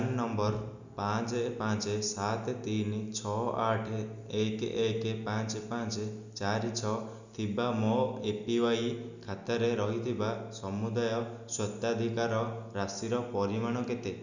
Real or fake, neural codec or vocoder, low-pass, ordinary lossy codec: real; none; 7.2 kHz; none